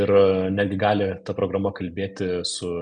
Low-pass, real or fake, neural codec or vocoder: 9.9 kHz; real; none